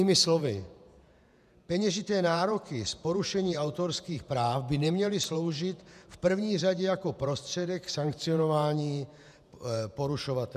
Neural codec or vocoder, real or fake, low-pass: vocoder, 48 kHz, 128 mel bands, Vocos; fake; 14.4 kHz